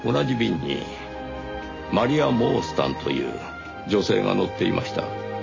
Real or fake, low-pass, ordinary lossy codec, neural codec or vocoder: real; 7.2 kHz; MP3, 32 kbps; none